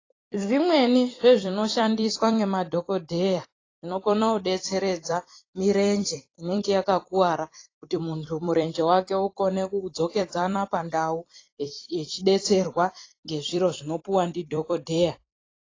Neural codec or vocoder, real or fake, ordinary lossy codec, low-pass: vocoder, 44.1 kHz, 128 mel bands every 256 samples, BigVGAN v2; fake; AAC, 32 kbps; 7.2 kHz